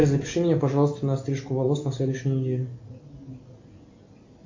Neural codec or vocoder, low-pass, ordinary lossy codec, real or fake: none; 7.2 kHz; MP3, 48 kbps; real